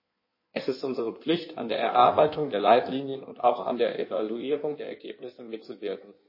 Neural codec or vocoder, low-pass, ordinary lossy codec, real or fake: codec, 16 kHz in and 24 kHz out, 1.1 kbps, FireRedTTS-2 codec; 5.4 kHz; MP3, 24 kbps; fake